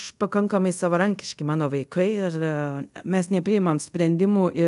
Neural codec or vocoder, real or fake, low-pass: codec, 24 kHz, 0.5 kbps, DualCodec; fake; 10.8 kHz